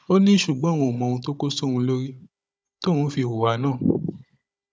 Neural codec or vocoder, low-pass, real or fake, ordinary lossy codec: codec, 16 kHz, 16 kbps, FunCodec, trained on Chinese and English, 50 frames a second; none; fake; none